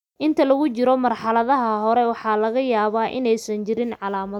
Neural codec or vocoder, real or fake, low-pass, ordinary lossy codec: none; real; 19.8 kHz; none